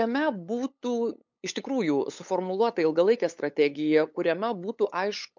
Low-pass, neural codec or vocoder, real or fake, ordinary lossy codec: 7.2 kHz; codec, 16 kHz, 8 kbps, FunCodec, trained on LibriTTS, 25 frames a second; fake; MP3, 64 kbps